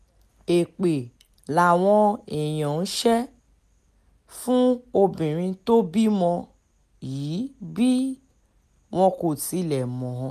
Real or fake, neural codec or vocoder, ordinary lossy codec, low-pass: real; none; MP3, 96 kbps; 14.4 kHz